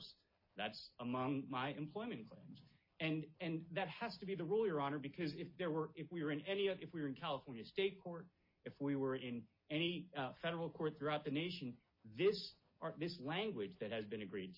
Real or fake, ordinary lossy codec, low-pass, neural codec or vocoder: real; MP3, 24 kbps; 5.4 kHz; none